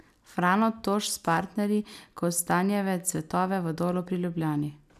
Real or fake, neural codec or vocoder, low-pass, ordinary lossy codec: real; none; 14.4 kHz; none